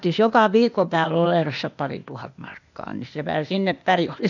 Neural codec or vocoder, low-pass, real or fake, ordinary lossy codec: codec, 16 kHz, 0.8 kbps, ZipCodec; 7.2 kHz; fake; none